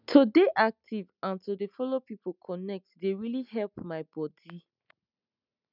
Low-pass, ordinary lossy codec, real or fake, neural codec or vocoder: 5.4 kHz; none; real; none